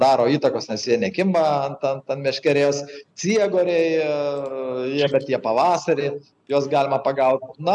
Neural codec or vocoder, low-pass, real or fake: none; 10.8 kHz; real